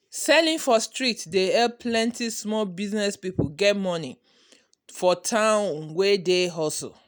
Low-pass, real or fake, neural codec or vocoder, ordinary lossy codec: none; real; none; none